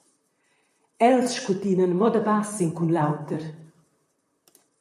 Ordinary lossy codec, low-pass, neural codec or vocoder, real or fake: MP3, 64 kbps; 14.4 kHz; vocoder, 44.1 kHz, 128 mel bands, Pupu-Vocoder; fake